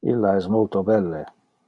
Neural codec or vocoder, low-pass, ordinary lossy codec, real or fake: none; 10.8 kHz; MP3, 64 kbps; real